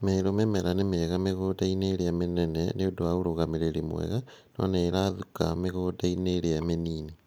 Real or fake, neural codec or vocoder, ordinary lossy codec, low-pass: real; none; none; none